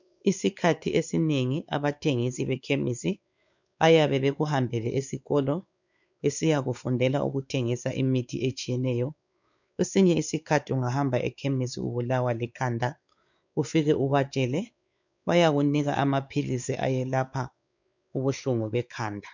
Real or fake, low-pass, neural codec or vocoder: fake; 7.2 kHz; codec, 16 kHz, 4 kbps, X-Codec, WavLM features, trained on Multilingual LibriSpeech